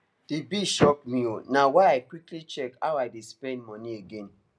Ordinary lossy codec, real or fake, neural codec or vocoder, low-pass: none; real; none; none